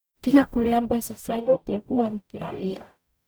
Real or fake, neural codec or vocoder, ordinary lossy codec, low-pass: fake; codec, 44.1 kHz, 0.9 kbps, DAC; none; none